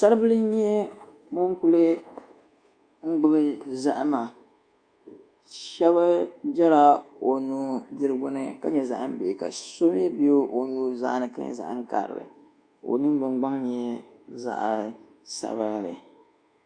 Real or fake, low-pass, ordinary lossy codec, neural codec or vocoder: fake; 9.9 kHz; Opus, 64 kbps; codec, 24 kHz, 1.2 kbps, DualCodec